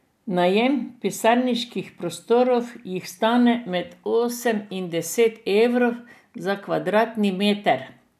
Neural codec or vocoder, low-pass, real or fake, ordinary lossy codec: none; 14.4 kHz; real; none